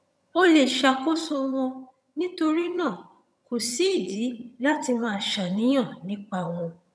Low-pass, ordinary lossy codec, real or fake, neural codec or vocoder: none; none; fake; vocoder, 22.05 kHz, 80 mel bands, HiFi-GAN